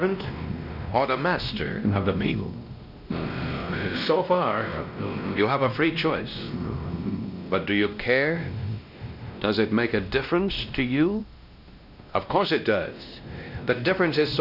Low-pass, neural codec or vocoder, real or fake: 5.4 kHz; codec, 16 kHz, 1 kbps, X-Codec, WavLM features, trained on Multilingual LibriSpeech; fake